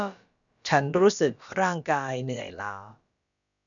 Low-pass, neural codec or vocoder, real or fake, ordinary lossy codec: 7.2 kHz; codec, 16 kHz, about 1 kbps, DyCAST, with the encoder's durations; fake; none